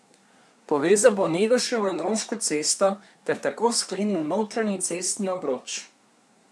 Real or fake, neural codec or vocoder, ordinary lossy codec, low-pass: fake; codec, 24 kHz, 1 kbps, SNAC; none; none